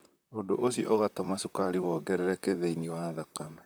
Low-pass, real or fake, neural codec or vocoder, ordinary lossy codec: none; fake; vocoder, 44.1 kHz, 128 mel bands, Pupu-Vocoder; none